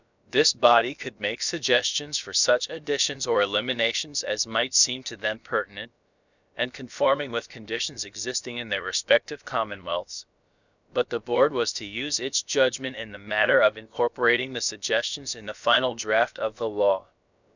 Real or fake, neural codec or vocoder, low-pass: fake; codec, 16 kHz, about 1 kbps, DyCAST, with the encoder's durations; 7.2 kHz